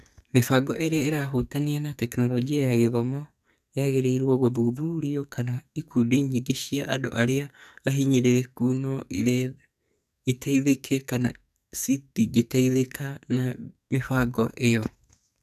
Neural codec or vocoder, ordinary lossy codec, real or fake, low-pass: codec, 44.1 kHz, 2.6 kbps, SNAC; none; fake; 14.4 kHz